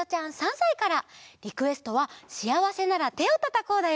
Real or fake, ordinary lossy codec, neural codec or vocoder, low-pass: real; none; none; none